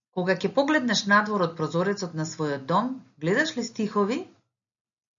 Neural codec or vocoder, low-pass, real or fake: none; 7.2 kHz; real